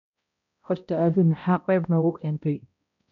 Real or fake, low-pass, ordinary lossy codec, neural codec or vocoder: fake; 7.2 kHz; MP3, 96 kbps; codec, 16 kHz, 0.5 kbps, X-Codec, HuBERT features, trained on balanced general audio